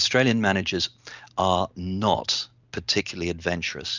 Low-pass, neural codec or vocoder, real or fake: 7.2 kHz; none; real